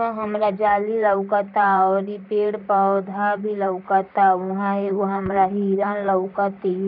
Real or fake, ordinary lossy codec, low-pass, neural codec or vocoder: fake; none; 5.4 kHz; vocoder, 44.1 kHz, 128 mel bands, Pupu-Vocoder